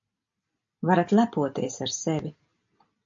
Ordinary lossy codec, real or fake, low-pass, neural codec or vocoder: MP3, 48 kbps; real; 7.2 kHz; none